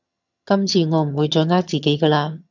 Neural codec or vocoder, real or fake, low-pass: vocoder, 22.05 kHz, 80 mel bands, HiFi-GAN; fake; 7.2 kHz